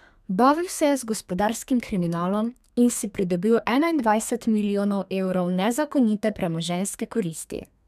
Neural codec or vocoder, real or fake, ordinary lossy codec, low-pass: codec, 32 kHz, 1.9 kbps, SNAC; fake; none; 14.4 kHz